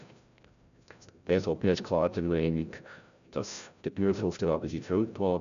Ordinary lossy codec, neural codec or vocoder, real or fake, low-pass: none; codec, 16 kHz, 0.5 kbps, FreqCodec, larger model; fake; 7.2 kHz